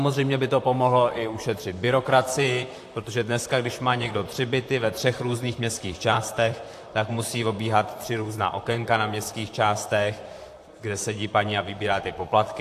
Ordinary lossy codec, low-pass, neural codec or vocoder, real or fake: AAC, 64 kbps; 14.4 kHz; vocoder, 44.1 kHz, 128 mel bands, Pupu-Vocoder; fake